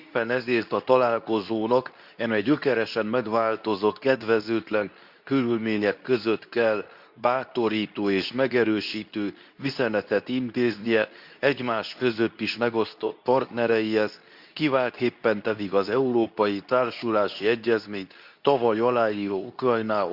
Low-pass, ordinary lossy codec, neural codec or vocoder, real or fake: 5.4 kHz; Opus, 64 kbps; codec, 24 kHz, 0.9 kbps, WavTokenizer, medium speech release version 2; fake